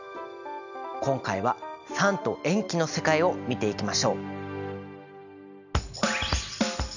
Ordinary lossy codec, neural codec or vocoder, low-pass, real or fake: none; none; 7.2 kHz; real